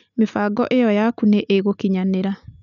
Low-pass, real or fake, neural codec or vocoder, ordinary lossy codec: 7.2 kHz; real; none; none